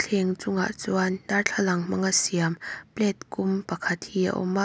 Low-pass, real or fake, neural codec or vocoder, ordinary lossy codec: none; real; none; none